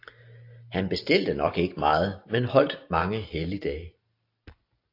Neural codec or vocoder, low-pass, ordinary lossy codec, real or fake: none; 5.4 kHz; AAC, 32 kbps; real